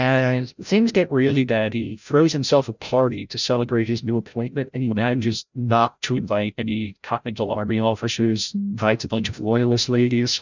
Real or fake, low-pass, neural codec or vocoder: fake; 7.2 kHz; codec, 16 kHz, 0.5 kbps, FreqCodec, larger model